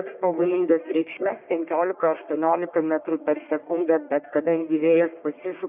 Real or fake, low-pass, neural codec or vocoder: fake; 3.6 kHz; codec, 44.1 kHz, 1.7 kbps, Pupu-Codec